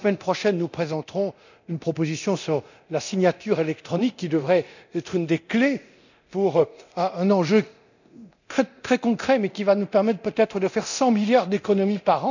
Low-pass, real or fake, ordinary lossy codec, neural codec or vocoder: 7.2 kHz; fake; none; codec, 24 kHz, 0.9 kbps, DualCodec